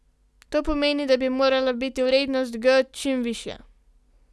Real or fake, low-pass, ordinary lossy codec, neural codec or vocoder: real; none; none; none